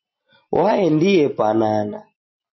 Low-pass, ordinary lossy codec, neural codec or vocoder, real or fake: 7.2 kHz; MP3, 24 kbps; none; real